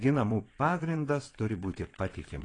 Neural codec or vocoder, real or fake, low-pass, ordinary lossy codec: vocoder, 22.05 kHz, 80 mel bands, WaveNeXt; fake; 9.9 kHz; AAC, 32 kbps